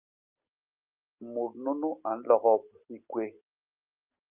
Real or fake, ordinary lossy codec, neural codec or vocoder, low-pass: real; Opus, 24 kbps; none; 3.6 kHz